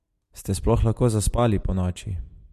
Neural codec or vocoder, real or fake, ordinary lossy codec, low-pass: none; real; MP3, 64 kbps; 14.4 kHz